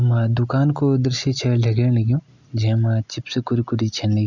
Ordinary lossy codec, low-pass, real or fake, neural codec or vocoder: none; 7.2 kHz; real; none